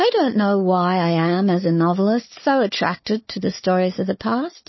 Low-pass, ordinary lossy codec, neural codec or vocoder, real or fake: 7.2 kHz; MP3, 24 kbps; codec, 16 kHz, 4 kbps, FunCodec, trained on Chinese and English, 50 frames a second; fake